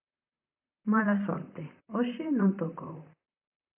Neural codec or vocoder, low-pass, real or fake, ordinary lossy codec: vocoder, 44.1 kHz, 128 mel bands, Pupu-Vocoder; 3.6 kHz; fake; AAC, 32 kbps